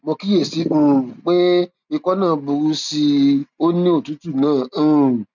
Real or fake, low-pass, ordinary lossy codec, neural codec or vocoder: real; 7.2 kHz; none; none